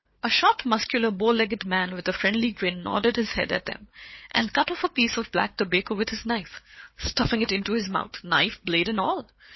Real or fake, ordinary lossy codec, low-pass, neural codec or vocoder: fake; MP3, 24 kbps; 7.2 kHz; codec, 16 kHz, 4 kbps, FunCodec, trained on Chinese and English, 50 frames a second